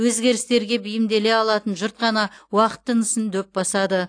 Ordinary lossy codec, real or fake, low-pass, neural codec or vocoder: AAC, 48 kbps; real; 9.9 kHz; none